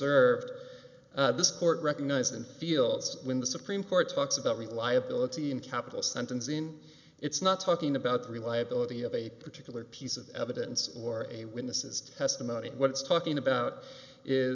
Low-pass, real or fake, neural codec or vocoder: 7.2 kHz; real; none